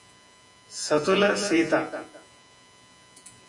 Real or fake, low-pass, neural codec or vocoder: fake; 10.8 kHz; vocoder, 48 kHz, 128 mel bands, Vocos